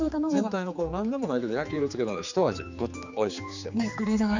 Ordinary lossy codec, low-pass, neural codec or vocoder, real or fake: none; 7.2 kHz; codec, 16 kHz, 4 kbps, X-Codec, HuBERT features, trained on general audio; fake